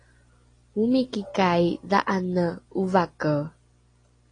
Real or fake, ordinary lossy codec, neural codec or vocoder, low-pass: real; AAC, 32 kbps; none; 9.9 kHz